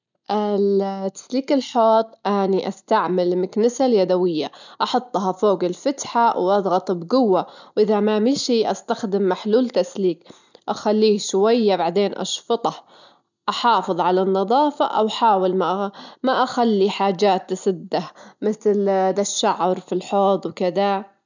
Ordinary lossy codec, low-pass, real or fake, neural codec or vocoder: none; 7.2 kHz; real; none